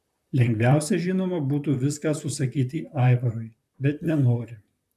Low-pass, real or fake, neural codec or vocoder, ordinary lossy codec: 14.4 kHz; fake; vocoder, 44.1 kHz, 128 mel bands, Pupu-Vocoder; AAC, 96 kbps